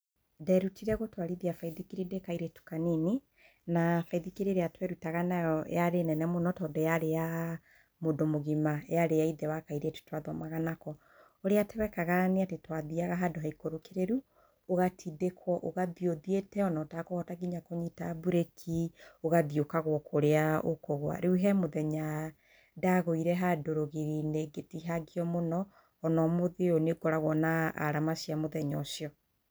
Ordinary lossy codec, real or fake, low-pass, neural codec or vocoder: none; real; none; none